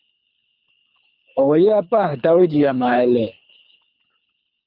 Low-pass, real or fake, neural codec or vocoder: 5.4 kHz; fake; codec, 24 kHz, 3 kbps, HILCodec